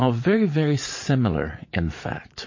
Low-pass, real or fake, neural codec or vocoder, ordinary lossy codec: 7.2 kHz; fake; vocoder, 44.1 kHz, 80 mel bands, Vocos; MP3, 32 kbps